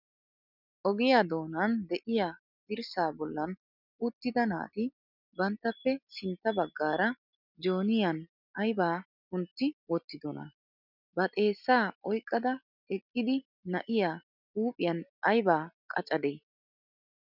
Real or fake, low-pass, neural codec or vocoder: real; 5.4 kHz; none